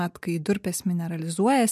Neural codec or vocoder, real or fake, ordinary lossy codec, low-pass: none; real; MP3, 96 kbps; 14.4 kHz